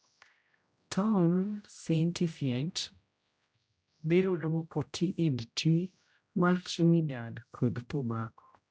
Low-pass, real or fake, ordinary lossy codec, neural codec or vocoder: none; fake; none; codec, 16 kHz, 0.5 kbps, X-Codec, HuBERT features, trained on general audio